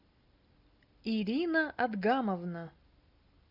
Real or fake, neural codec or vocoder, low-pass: real; none; 5.4 kHz